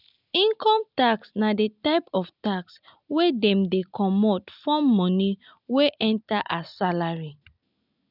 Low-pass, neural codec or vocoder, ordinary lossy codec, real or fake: 5.4 kHz; none; none; real